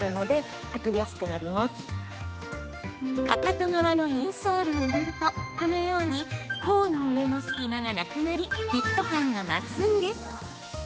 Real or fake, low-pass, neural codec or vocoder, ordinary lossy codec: fake; none; codec, 16 kHz, 2 kbps, X-Codec, HuBERT features, trained on general audio; none